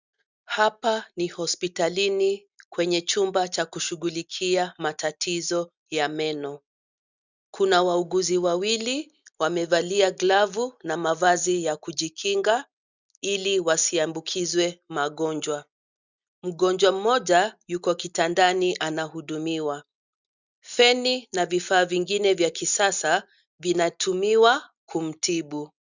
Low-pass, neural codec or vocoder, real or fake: 7.2 kHz; none; real